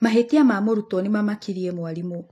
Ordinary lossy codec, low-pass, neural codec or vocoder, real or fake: AAC, 48 kbps; 14.4 kHz; none; real